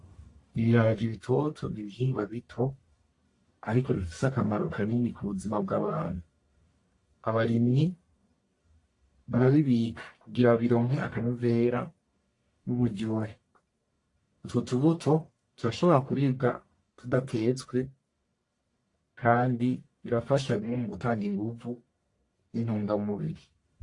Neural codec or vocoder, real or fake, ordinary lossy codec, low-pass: codec, 44.1 kHz, 1.7 kbps, Pupu-Codec; fake; AAC, 48 kbps; 10.8 kHz